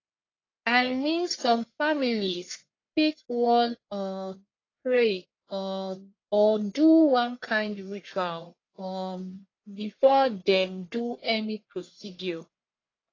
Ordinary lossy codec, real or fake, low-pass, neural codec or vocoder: AAC, 32 kbps; fake; 7.2 kHz; codec, 44.1 kHz, 1.7 kbps, Pupu-Codec